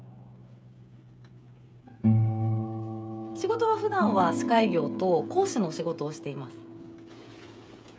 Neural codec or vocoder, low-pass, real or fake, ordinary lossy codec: codec, 16 kHz, 16 kbps, FreqCodec, smaller model; none; fake; none